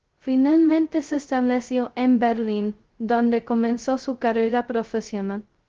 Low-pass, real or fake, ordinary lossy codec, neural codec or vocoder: 7.2 kHz; fake; Opus, 16 kbps; codec, 16 kHz, 0.2 kbps, FocalCodec